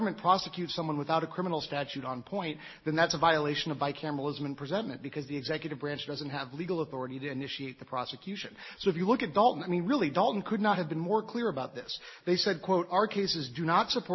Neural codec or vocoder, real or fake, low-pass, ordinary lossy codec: none; real; 7.2 kHz; MP3, 24 kbps